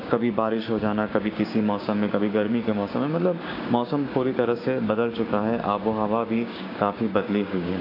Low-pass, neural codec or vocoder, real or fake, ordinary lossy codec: 5.4 kHz; codec, 16 kHz, 6 kbps, DAC; fake; AAC, 32 kbps